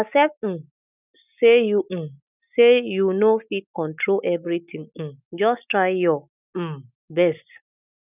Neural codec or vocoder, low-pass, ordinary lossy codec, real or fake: none; 3.6 kHz; none; real